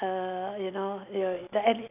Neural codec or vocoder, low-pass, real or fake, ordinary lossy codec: none; 3.6 kHz; real; none